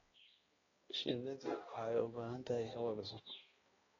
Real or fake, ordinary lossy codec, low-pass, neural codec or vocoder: fake; AAC, 24 kbps; 7.2 kHz; codec, 16 kHz, 1 kbps, X-Codec, HuBERT features, trained on balanced general audio